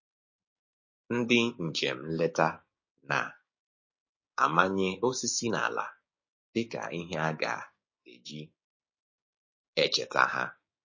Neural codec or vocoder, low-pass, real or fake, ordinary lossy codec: codec, 16 kHz, 6 kbps, DAC; 7.2 kHz; fake; MP3, 32 kbps